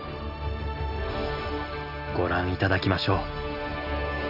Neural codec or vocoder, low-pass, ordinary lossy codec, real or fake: none; 5.4 kHz; none; real